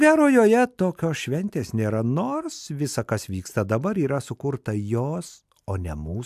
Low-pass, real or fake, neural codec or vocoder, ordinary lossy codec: 14.4 kHz; real; none; AAC, 96 kbps